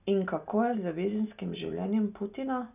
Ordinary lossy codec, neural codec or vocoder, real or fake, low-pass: none; none; real; 3.6 kHz